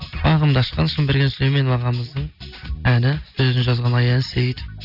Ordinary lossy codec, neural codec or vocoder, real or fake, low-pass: none; none; real; 5.4 kHz